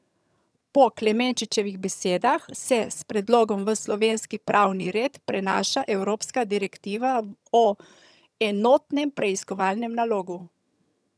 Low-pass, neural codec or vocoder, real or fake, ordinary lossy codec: none; vocoder, 22.05 kHz, 80 mel bands, HiFi-GAN; fake; none